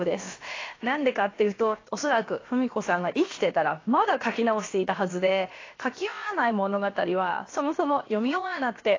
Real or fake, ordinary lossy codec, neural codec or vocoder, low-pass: fake; AAC, 32 kbps; codec, 16 kHz, about 1 kbps, DyCAST, with the encoder's durations; 7.2 kHz